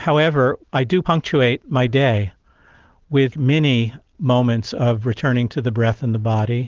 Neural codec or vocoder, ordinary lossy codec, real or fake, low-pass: none; Opus, 16 kbps; real; 7.2 kHz